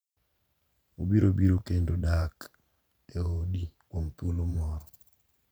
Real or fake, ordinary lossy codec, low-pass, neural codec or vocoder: fake; none; none; vocoder, 44.1 kHz, 128 mel bands every 512 samples, BigVGAN v2